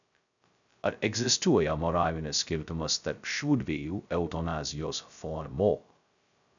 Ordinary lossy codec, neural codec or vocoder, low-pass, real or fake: MP3, 96 kbps; codec, 16 kHz, 0.2 kbps, FocalCodec; 7.2 kHz; fake